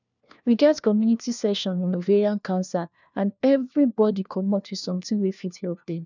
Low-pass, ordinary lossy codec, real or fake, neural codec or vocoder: 7.2 kHz; none; fake; codec, 16 kHz, 1 kbps, FunCodec, trained on LibriTTS, 50 frames a second